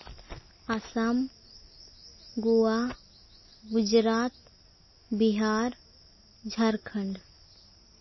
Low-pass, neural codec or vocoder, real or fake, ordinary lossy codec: 7.2 kHz; none; real; MP3, 24 kbps